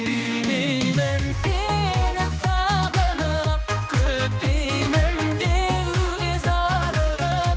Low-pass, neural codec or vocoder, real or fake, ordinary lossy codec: none; codec, 16 kHz, 2 kbps, X-Codec, HuBERT features, trained on general audio; fake; none